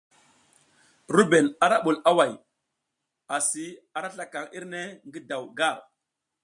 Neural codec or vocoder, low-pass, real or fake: none; 10.8 kHz; real